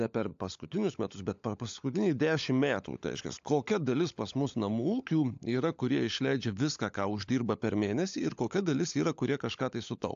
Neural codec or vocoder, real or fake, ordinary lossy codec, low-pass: codec, 16 kHz, 16 kbps, FunCodec, trained on LibriTTS, 50 frames a second; fake; AAC, 64 kbps; 7.2 kHz